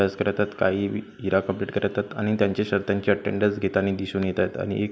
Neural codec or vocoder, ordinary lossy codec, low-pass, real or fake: none; none; none; real